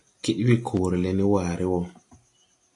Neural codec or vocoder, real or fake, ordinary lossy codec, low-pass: none; real; AAC, 48 kbps; 10.8 kHz